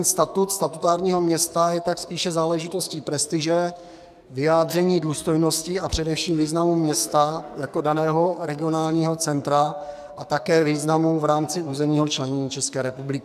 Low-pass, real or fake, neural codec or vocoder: 14.4 kHz; fake; codec, 44.1 kHz, 2.6 kbps, SNAC